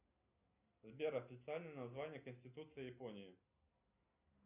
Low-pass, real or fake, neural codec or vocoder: 3.6 kHz; real; none